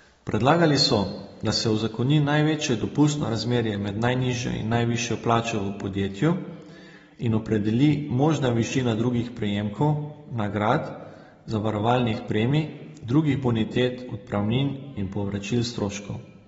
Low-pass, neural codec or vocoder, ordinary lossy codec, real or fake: 19.8 kHz; none; AAC, 24 kbps; real